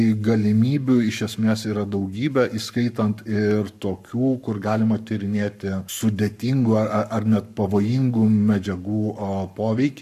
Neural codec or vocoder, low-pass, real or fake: codec, 44.1 kHz, 7.8 kbps, Pupu-Codec; 14.4 kHz; fake